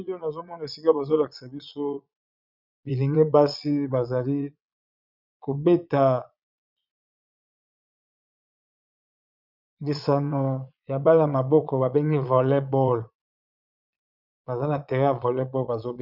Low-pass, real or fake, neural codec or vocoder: 5.4 kHz; fake; vocoder, 22.05 kHz, 80 mel bands, Vocos